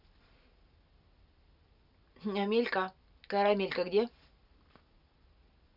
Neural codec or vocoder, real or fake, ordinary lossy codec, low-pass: none; real; none; 5.4 kHz